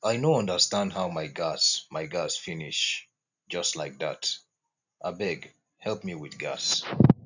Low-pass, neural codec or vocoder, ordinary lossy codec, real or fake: 7.2 kHz; none; none; real